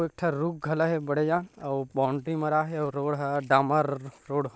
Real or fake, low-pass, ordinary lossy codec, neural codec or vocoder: real; none; none; none